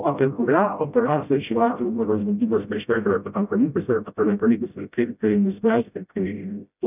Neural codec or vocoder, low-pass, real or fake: codec, 16 kHz, 0.5 kbps, FreqCodec, smaller model; 3.6 kHz; fake